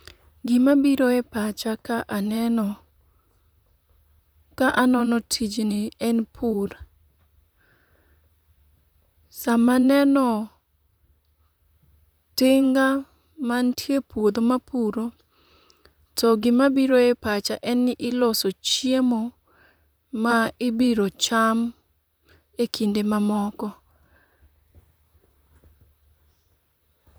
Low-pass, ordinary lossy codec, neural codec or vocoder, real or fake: none; none; vocoder, 44.1 kHz, 128 mel bands, Pupu-Vocoder; fake